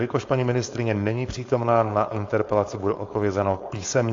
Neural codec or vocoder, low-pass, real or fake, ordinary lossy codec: codec, 16 kHz, 4.8 kbps, FACodec; 7.2 kHz; fake; AAC, 48 kbps